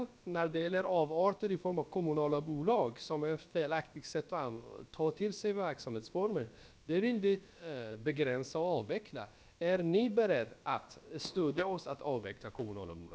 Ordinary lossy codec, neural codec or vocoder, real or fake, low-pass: none; codec, 16 kHz, about 1 kbps, DyCAST, with the encoder's durations; fake; none